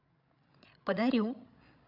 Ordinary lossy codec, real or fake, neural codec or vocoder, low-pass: AAC, 48 kbps; fake; codec, 16 kHz, 16 kbps, FreqCodec, larger model; 5.4 kHz